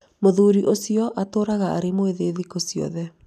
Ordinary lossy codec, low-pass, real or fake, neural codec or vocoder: none; 14.4 kHz; real; none